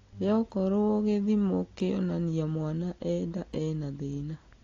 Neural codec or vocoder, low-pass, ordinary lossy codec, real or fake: none; 7.2 kHz; AAC, 32 kbps; real